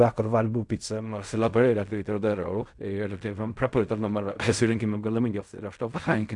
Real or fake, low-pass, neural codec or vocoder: fake; 10.8 kHz; codec, 16 kHz in and 24 kHz out, 0.4 kbps, LongCat-Audio-Codec, fine tuned four codebook decoder